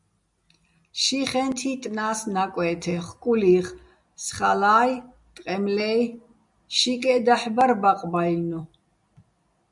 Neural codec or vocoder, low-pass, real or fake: none; 10.8 kHz; real